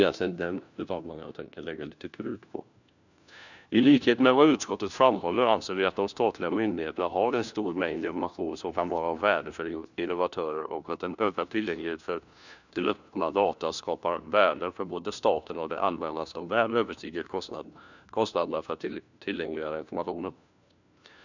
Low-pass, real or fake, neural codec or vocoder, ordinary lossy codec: 7.2 kHz; fake; codec, 16 kHz, 1 kbps, FunCodec, trained on LibriTTS, 50 frames a second; none